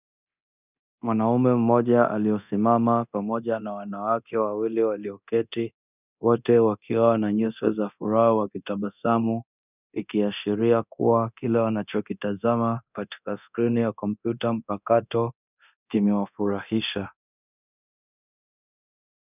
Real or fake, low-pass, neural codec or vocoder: fake; 3.6 kHz; codec, 24 kHz, 0.9 kbps, DualCodec